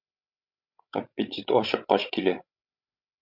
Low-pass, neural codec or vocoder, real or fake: 5.4 kHz; codec, 16 kHz, 16 kbps, FreqCodec, larger model; fake